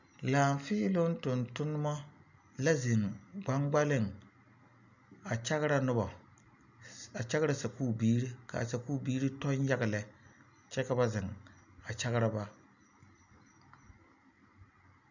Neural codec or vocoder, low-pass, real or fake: none; 7.2 kHz; real